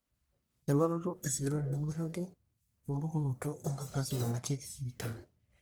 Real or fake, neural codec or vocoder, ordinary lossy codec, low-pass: fake; codec, 44.1 kHz, 1.7 kbps, Pupu-Codec; none; none